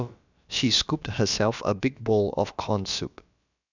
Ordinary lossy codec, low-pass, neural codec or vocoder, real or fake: none; 7.2 kHz; codec, 16 kHz, about 1 kbps, DyCAST, with the encoder's durations; fake